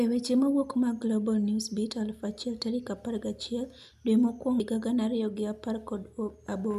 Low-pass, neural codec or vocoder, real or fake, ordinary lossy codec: 14.4 kHz; none; real; none